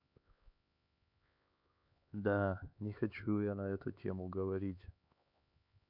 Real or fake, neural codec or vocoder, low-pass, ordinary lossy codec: fake; codec, 16 kHz, 4 kbps, X-Codec, HuBERT features, trained on LibriSpeech; 5.4 kHz; none